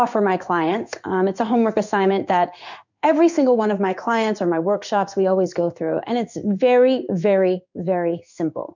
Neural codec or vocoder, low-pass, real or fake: codec, 16 kHz in and 24 kHz out, 1 kbps, XY-Tokenizer; 7.2 kHz; fake